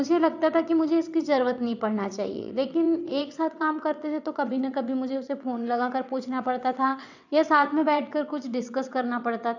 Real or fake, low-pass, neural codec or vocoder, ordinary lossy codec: fake; 7.2 kHz; vocoder, 22.05 kHz, 80 mel bands, WaveNeXt; none